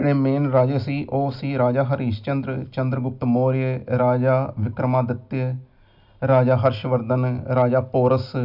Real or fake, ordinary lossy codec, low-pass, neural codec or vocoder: real; none; 5.4 kHz; none